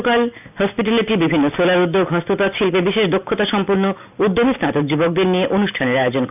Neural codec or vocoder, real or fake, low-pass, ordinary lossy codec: none; real; 3.6 kHz; none